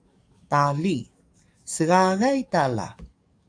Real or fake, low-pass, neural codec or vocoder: fake; 9.9 kHz; codec, 44.1 kHz, 7.8 kbps, DAC